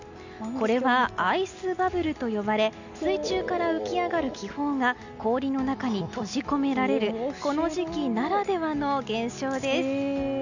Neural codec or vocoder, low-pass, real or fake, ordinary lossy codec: none; 7.2 kHz; real; none